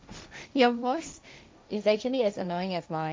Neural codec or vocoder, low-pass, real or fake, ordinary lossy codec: codec, 16 kHz, 1.1 kbps, Voila-Tokenizer; none; fake; none